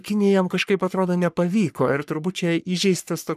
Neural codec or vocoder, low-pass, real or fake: codec, 44.1 kHz, 3.4 kbps, Pupu-Codec; 14.4 kHz; fake